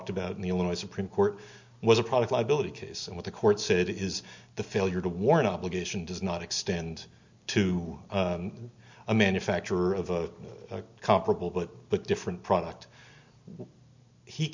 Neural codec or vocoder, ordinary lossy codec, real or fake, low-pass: none; MP3, 64 kbps; real; 7.2 kHz